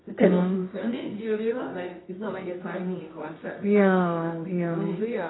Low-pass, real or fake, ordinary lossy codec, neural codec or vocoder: 7.2 kHz; fake; AAC, 16 kbps; codec, 24 kHz, 0.9 kbps, WavTokenizer, medium music audio release